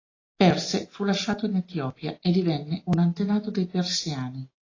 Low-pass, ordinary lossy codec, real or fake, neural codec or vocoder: 7.2 kHz; AAC, 32 kbps; real; none